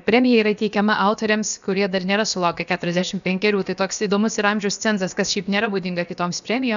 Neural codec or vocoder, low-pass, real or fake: codec, 16 kHz, about 1 kbps, DyCAST, with the encoder's durations; 7.2 kHz; fake